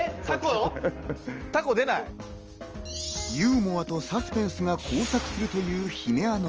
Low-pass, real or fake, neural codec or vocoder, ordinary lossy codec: 7.2 kHz; real; none; Opus, 24 kbps